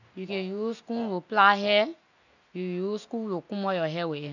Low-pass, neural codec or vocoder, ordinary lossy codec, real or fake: 7.2 kHz; none; none; real